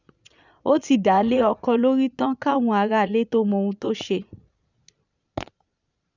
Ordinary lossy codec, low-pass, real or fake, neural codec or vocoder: none; 7.2 kHz; fake; vocoder, 22.05 kHz, 80 mel bands, Vocos